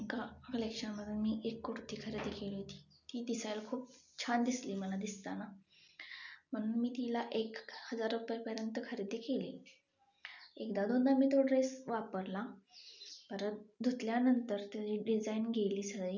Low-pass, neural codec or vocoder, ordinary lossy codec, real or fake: 7.2 kHz; none; none; real